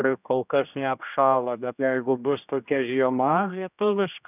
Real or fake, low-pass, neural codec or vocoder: fake; 3.6 kHz; codec, 16 kHz, 1 kbps, X-Codec, HuBERT features, trained on general audio